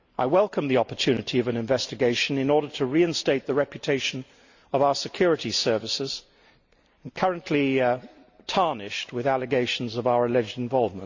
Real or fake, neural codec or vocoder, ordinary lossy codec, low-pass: real; none; Opus, 64 kbps; 7.2 kHz